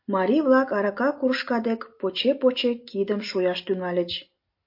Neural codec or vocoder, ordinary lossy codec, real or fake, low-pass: none; MP3, 32 kbps; real; 5.4 kHz